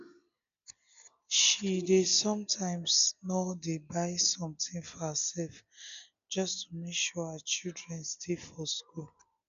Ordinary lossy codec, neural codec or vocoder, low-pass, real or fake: none; none; 7.2 kHz; real